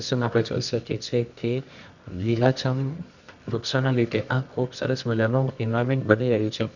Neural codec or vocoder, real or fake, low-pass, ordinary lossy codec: codec, 24 kHz, 0.9 kbps, WavTokenizer, medium music audio release; fake; 7.2 kHz; none